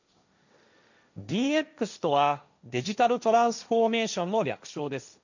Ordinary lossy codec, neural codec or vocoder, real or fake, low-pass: none; codec, 16 kHz, 1.1 kbps, Voila-Tokenizer; fake; 7.2 kHz